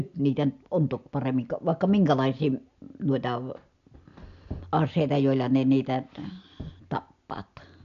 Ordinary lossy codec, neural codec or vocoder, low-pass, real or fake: AAC, 64 kbps; none; 7.2 kHz; real